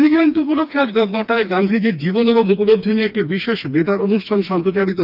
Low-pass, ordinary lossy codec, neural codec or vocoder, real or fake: 5.4 kHz; none; codec, 16 kHz, 2 kbps, FreqCodec, smaller model; fake